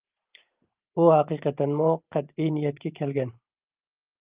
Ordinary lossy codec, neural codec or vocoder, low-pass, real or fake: Opus, 32 kbps; vocoder, 44.1 kHz, 128 mel bands every 512 samples, BigVGAN v2; 3.6 kHz; fake